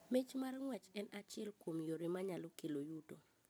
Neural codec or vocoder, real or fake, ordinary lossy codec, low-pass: vocoder, 44.1 kHz, 128 mel bands every 256 samples, BigVGAN v2; fake; none; none